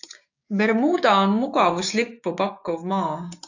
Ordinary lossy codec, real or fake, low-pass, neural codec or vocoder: AAC, 48 kbps; fake; 7.2 kHz; codec, 16 kHz, 6 kbps, DAC